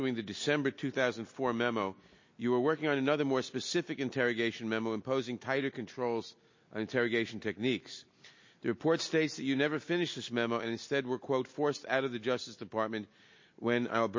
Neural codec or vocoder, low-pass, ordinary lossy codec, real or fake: none; 7.2 kHz; MP3, 32 kbps; real